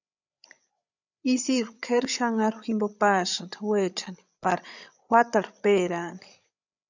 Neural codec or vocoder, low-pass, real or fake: codec, 16 kHz, 8 kbps, FreqCodec, larger model; 7.2 kHz; fake